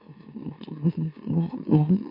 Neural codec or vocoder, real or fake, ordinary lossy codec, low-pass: autoencoder, 44.1 kHz, a latent of 192 numbers a frame, MeloTTS; fake; MP3, 32 kbps; 5.4 kHz